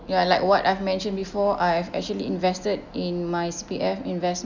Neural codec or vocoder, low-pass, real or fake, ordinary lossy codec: none; 7.2 kHz; real; none